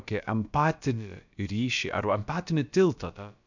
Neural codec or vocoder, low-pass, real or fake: codec, 16 kHz, about 1 kbps, DyCAST, with the encoder's durations; 7.2 kHz; fake